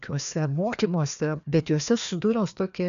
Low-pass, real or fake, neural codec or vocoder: 7.2 kHz; fake; codec, 16 kHz, 2 kbps, FreqCodec, larger model